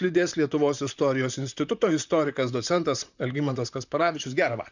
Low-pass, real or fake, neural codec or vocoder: 7.2 kHz; fake; vocoder, 44.1 kHz, 128 mel bands, Pupu-Vocoder